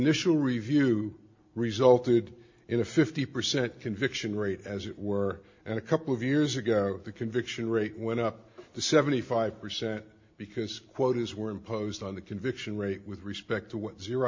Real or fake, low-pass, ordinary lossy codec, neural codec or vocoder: real; 7.2 kHz; MP3, 48 kbps; none